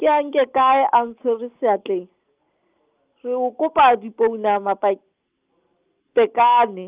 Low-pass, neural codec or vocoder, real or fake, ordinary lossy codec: 3.6 kHz; none; real; Opus, 32 kbps